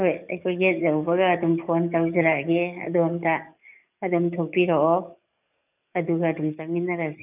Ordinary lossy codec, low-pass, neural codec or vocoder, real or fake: none; 3.6 kHz; codec, 44.1 kHz, 7.8 kbps, DAC; fake